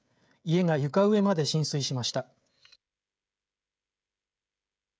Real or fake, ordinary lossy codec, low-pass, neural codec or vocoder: fake; none; none; codec, 16 kHz, 16 kbps, FreqCodec, smaller model